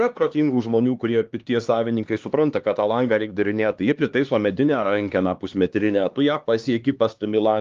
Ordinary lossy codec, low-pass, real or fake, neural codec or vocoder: Opus, 32 kbps; 7.2 kHz; fake; codec, 16 kHz, 2 kbps, X-Codec, HuBERT features, trained on LibriSpeech